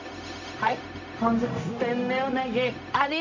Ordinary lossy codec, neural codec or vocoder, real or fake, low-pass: none; codec, 16 kHz, 0.4 kbps, LongCat-Audio-Codec; fake; 7.2 kHz